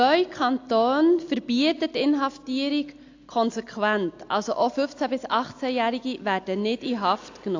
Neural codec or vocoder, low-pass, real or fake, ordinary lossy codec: none; 7.2 kHz; real; AAC, 48 kbps